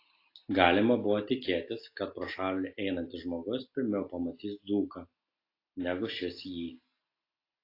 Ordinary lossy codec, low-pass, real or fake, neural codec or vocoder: AAC, 32 kbps; 5.4 kHz; real; none